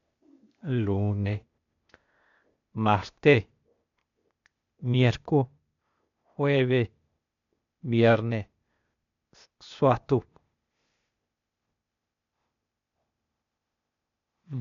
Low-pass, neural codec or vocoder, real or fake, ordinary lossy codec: 7.2 kHz; codec, 16 kHz, 0.8 kbps, ZipCodec; fake; MP3, 64 kbps